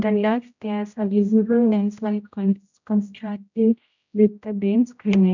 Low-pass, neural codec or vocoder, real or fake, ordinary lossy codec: 7.2 kHz; codec, 16 kHz, 0.5 kbps, X-Codec, HuBERT features, trained on general audio; fake; none